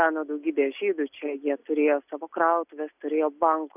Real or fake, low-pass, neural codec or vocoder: real; 3.6 kHz; none